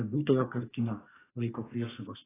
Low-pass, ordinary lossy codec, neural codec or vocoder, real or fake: 3.6 kHz; AAC, 16 kbps; codec, 44.1 kHz, 2.6 kbps, SNAC; fake